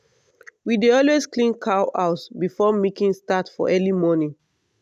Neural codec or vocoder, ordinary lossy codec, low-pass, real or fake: none; none; 14.4 kHz; real